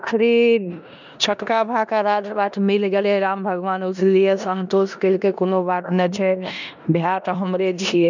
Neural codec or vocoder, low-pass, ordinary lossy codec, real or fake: codec, 16 kHz in and 24 kHz out, 0.9 kbps, LongCat-Audio-Codec, four codebook decoder; 7.2 kHz; none; fake